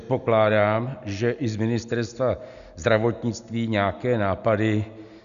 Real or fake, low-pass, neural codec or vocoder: real; 7.2 kHz; none